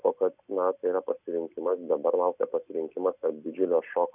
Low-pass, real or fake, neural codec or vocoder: 3.6 kHz; real; none